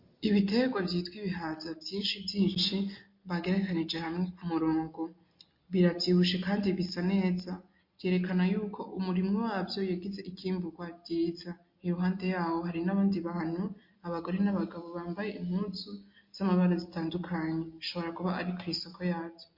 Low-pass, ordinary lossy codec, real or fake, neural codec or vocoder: 5.4 kHz; MP3, 32 kbps; real; none